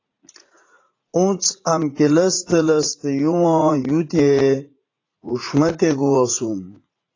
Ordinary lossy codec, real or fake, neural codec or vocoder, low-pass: AAC, 32 kbps; fake; vocoder, 44.1 kHz, 80 mel bands, Vocos; 7.2 kHz